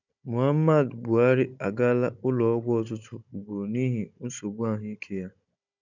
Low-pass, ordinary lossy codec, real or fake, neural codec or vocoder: 7.2 kHz; none; fake; codec, 16 kHz, 16 kbps, FunCodec, trained on Chinese and English, 50 frames a second